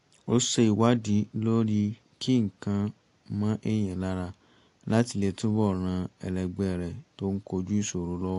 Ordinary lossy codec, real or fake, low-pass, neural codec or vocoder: AAC, 48 kbps; real; 10.8 kHz; none